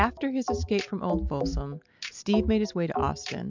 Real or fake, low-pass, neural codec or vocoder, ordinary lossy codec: real; 7.2 kHz; none; MP3, 64 kbps